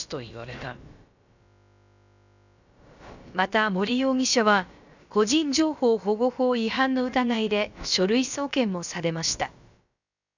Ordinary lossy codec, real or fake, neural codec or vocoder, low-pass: none; fake; codec, 16 kHz, about 1 kbps, DyCAST, with the encoder's durations; 7.2 kHz